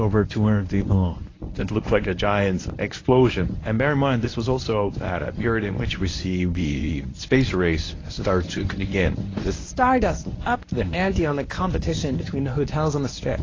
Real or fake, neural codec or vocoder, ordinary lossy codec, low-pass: fake; codec, 24 kHz, 0.9 kbps, WavTokenizer, medium speech release version 1; AAC, 32 kbps; 7.2 kHz